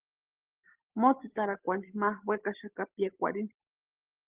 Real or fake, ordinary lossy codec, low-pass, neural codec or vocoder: real; Opus, 16 kbps; 3.6 kHz; none